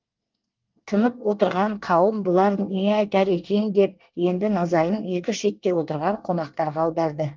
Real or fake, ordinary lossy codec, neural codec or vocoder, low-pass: fake; Opus, 24 kbps; codec, 24 kHz, 1 kbps, SNAC; 7.2 kHz